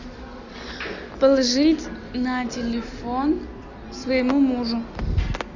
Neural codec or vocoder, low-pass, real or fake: none; 7.2 kHz; real